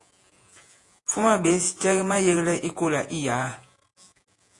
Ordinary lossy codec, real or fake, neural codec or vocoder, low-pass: AAC, 48 kbps; fake; vocoder, 48 kHz, 128 mel bands, Vocos; 10.8 kHz